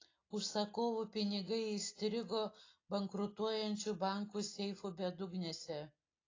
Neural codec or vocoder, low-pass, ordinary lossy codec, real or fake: none; 7.2 kHz; AAC, 32 kbps; real